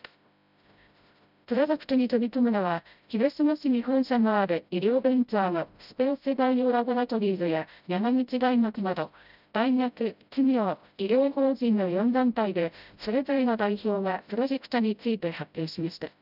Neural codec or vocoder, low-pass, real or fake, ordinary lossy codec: codec, 16 kHz, 0.5 kbps, FreqCodec, smaller model; 5.4 kHz; fake; none